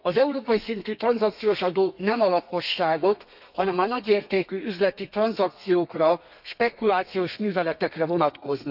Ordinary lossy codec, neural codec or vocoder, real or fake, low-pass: none; codec, 32 kHz, 1.9 kbps, SNAC; fake; 5.4 kHz